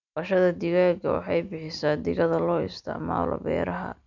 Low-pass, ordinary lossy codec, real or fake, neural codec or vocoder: 7.2 kHz; none; real; none